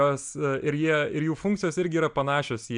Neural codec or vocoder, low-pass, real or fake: none; 9.9 kHz; real